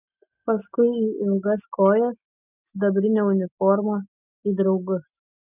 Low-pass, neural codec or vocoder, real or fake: 3.6 kHz; none; real